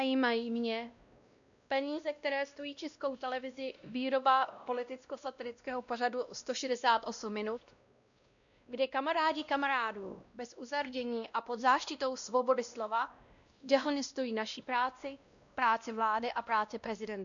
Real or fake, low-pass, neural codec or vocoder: fake; 7.2 kHz; codec, 16 kHz, 1 kbps, X-Codec, WavLM features, trained on Multilingual LibriSpeech